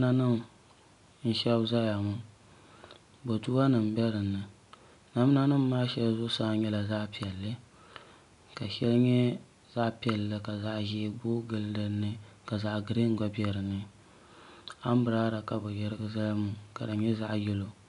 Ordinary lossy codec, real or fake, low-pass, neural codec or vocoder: MP3, 96 kbps; real; 10.8 kHz; none